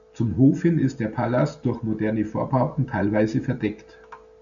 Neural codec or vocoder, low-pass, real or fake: none; 7.2 kHz; real